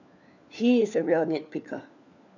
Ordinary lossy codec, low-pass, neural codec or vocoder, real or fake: none; 7.2 kHz; codec, 16 kHz, 4 kbps, FunCodec, trained on LibriTTS, 50 frames a second; fake